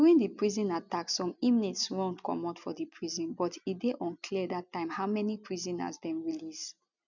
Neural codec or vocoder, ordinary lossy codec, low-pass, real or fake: none; none; 7.2 kHz; real